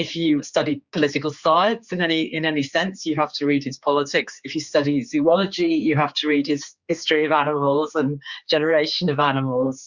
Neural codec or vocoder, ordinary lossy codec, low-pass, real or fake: codec, 16 kHz, 4 kbps, X-Codec, HuBERT features, trained on general audio; Opus, 64 kbps; 7.2 kHz; fake